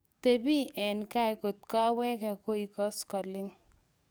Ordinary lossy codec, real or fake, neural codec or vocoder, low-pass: none; fake; codec, 44.1 kHz, 7.8 kbps, DAC; none